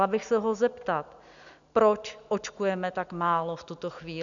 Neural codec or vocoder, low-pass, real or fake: none; 7.2 kHz; real